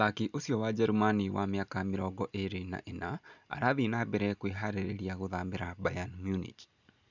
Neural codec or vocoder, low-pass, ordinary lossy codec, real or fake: none; 7.2 kHz; none; real